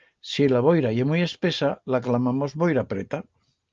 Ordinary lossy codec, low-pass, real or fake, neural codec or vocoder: Opus, 24 kbps; 7.2 kHz; real; none